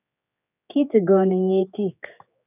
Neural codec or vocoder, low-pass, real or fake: codec, 16 kHz, 4 kbps, X-Codec, HuBERT features, trained on general audio; 3.6 kHz; fake